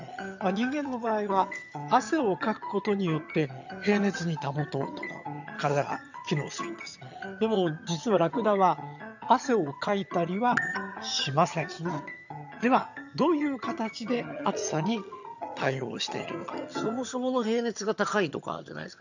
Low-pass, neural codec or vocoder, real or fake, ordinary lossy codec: 7.2 kHz; vocoder, 22.05 kHz, 80 mel bands, HiFi-GAN; fake; none